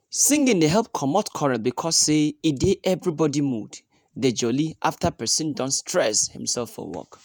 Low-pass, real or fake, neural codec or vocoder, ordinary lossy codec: none; fake; vocoder, 48 kHz, 128 mel bands, Vocos; none